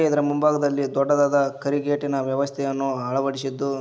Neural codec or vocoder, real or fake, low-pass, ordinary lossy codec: none; real; none; none